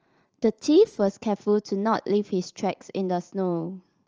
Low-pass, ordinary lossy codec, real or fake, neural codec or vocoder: 7.2 kHz; Opus, 24 kbps; fake; vocoder, 44.1 kHz, 80 mel bands, Vocos